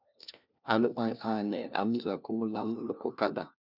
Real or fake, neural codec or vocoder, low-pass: fake; codec, 16 kHz, 1 kbps, FunCodec, trained on LibriTTS, 50 frames a second; 5.4 kHz